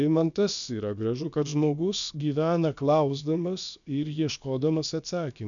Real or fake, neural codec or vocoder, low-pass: fake; codec, 16 kHz, about 1 kbps, DyCAST, with the encoder's durations; 7.2 kHz